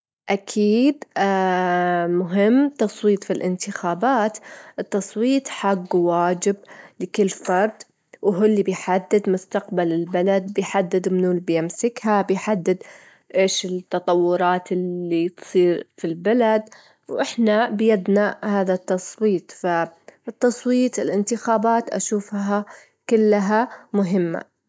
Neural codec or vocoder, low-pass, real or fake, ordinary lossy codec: none; none; real; none